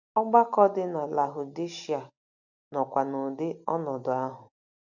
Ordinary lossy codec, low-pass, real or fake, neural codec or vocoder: none; 7.2 kHz; real; none